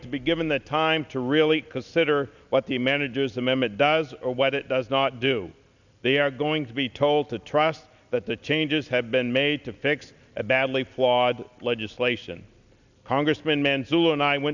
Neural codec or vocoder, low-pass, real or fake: none; 7.2 kHz; real